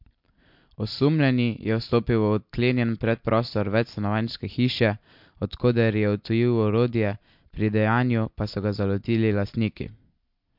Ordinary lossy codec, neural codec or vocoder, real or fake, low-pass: MP3, 48 kbps; none; real; 5.4 kHz